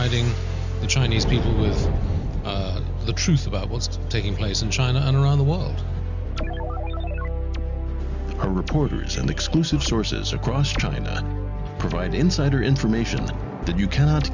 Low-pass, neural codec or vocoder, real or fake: 7.2 kHz; none; real